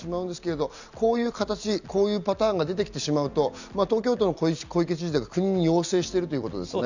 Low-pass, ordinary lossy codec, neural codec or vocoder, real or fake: 7.2 kHz; none; none; real